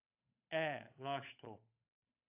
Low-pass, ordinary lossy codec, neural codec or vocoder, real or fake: 3.6 kHz; AAC, 32 kbps; codec, 16 kHz, 8 kbps, FunCodec, trained on Chinese and English, 25 frames a second; fake